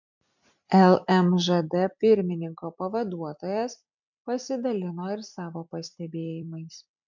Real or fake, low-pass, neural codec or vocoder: fake; 7.2 kHz; vocoder, 44.1 kHz, 128 mel bands every 256 samples, BigVGAN v2